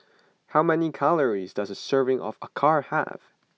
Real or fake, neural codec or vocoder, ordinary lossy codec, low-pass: real; none; none; none